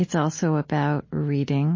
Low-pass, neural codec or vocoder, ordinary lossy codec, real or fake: 7.2 kHz; none; MP3, 32 kbps; real